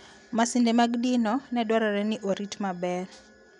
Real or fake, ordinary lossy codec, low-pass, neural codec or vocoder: real; none; 10.8 kHz; none